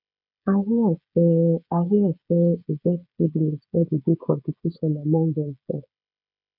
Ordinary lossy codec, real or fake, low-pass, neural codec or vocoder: none; fake; 5.4 kHz; codec, 16 kHz, 16 kbps, FreqCodec, smaller model